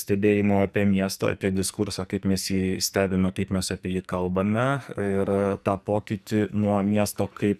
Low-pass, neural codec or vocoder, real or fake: 14.4 kHz; codec, 44.1 kHz, 2.6 kbps, SNAC; fake